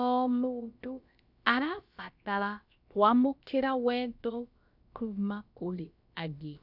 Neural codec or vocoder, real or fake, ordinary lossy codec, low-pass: codec, 16 kHz, about 1 kbps, DyCAST, with the encoder's durations; fake; none; 5.4 kHz